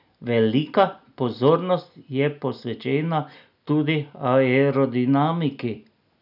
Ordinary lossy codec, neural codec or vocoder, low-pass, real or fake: none; none; 5.4 kHz; real